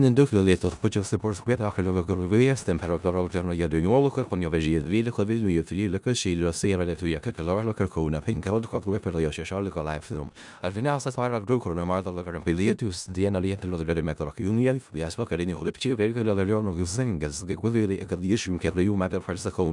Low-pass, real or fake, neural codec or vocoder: 10.8 kHz; fake; codec, 16 kHz in and 24 kHz out, 0.4 kbps, LongCat-Audio-Codec, four codebook decoder